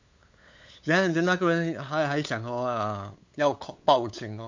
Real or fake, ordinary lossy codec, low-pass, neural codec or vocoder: fake; MP3, 48 kbps; 7.2 kHz; codec, 16 kHz, 8 kbps, FunCodec, trained on LibriTTS, 25 frames a second